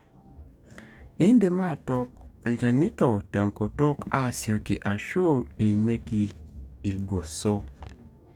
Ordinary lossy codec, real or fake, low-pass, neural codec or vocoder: none; fake; 19.8 kHz; codec, 44.1 kHz, 2.6 kbps, DAC